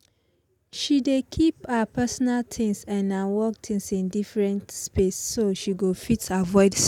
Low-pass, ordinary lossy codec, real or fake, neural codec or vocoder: 19.8 kHz; none; real; none